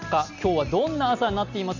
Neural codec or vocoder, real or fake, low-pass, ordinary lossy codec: none; real; 7.2 kHz; none